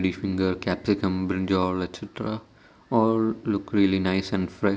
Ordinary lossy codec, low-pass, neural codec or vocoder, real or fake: none; none; none; real